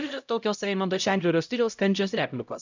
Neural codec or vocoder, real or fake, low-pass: codec, 16 kHz, 0.5 kbps, X-Codec, HuBERT features, trained on LibriSpeech; fake; 7.2 kHz